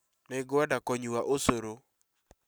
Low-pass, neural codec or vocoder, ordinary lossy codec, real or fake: none; none; none; real